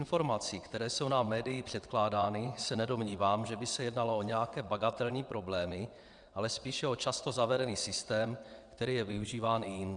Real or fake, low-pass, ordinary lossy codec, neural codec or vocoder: fake; 9.9 kHz; Opus, 64 kbps; vocoder, 22.05 kHz, 80 mel bands, WaveNeXt